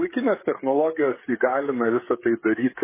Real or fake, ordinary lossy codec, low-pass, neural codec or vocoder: fake; MP3, 16 kbps; 3.6 kHz; codec, 16 kHz, 16 kbps, FreqCodec, smaller model